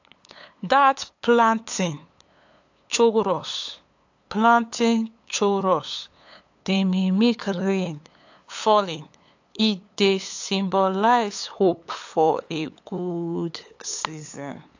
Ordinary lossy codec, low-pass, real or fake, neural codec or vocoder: AAC, 48 kbps; 7.2 kHz; fake; codec, 16 kHz, 8 kbps, FunCodec, trained on LibriTTS, 25 frames a second